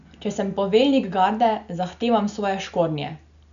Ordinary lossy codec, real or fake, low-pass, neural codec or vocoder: none; real; 7.2 kHz; none